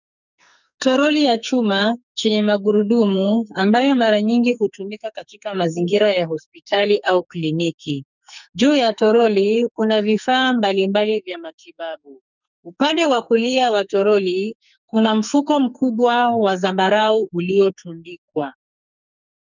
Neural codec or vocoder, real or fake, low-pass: codec, 44.1 kHz, 2.6 kbps, SNAC; fake; 7.2 kHz